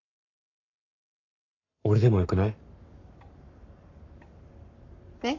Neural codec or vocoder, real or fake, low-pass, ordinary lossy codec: codec, 44.1 kHz, 7.8 kbps, Pupu-Codec; fake; 7.2 kHz; none